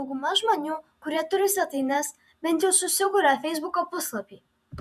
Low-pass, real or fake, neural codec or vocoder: 14.4 kHz; fake; vocoder, 48 kHz, 128 mel bands, Vocos